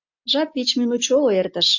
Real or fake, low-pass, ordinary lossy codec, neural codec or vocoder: real; 7.2 kHz; MP3, 48 kbps; none